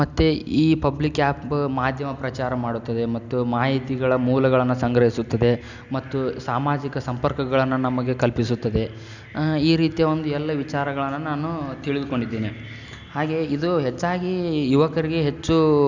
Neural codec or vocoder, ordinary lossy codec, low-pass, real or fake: none; none; 7.2 kHz; real